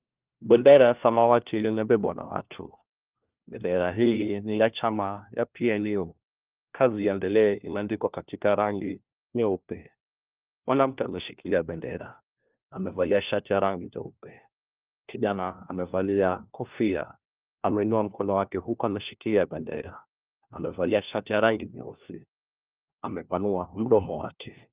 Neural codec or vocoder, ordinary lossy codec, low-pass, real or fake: codec, 16 kHz, 1 kbps, FunCodec, trained on LibriTTS, 50 frames a second; Opus, 32 kbps; 3.6 kHz; fake